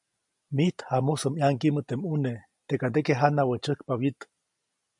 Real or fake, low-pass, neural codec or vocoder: real; 10.8 kHz; none